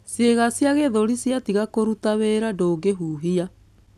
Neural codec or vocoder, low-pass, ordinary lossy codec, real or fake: none; 14.4 kHz; none; real